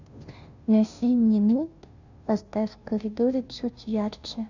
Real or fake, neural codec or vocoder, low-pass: fake; codec, 16 kHz, 0.5 kbps, FunCodec, trained on Chinese and English, 25 frames a second; 7.2 kHz